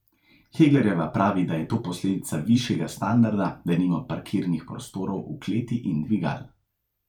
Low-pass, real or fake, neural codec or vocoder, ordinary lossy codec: 19.8 kHz; fake; vocoder, 44.1 kHz, 128 mel bands every 512 samples, BigVGAN v2; none